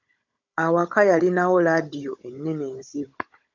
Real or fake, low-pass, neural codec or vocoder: fake; 7.2 kHz; codec, 16 kHz, 16 kbps, FunCodec, trained on Chinese and English, 50 frames a second